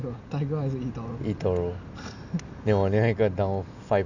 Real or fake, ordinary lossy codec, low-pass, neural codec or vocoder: real; none; 7.2 kHz; none